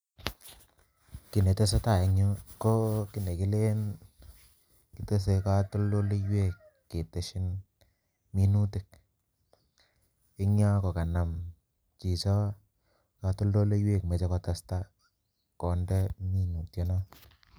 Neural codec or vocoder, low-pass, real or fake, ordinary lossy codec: none; none; real; none